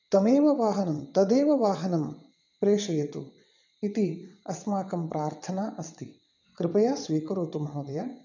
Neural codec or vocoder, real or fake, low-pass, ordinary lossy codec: vocoder, 22.05 kHz, 80 mel bands, WaveNeXt; fake; 7.2 kHz; none